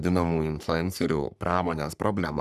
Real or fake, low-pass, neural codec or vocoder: fake; 14.4 kHz; codec, 44.1 kHz, 3.4 kbps, Pupu-Codec